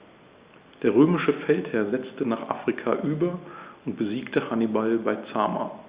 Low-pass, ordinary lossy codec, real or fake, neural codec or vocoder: 3.6 kHz; Opus, 64 kbps; real; none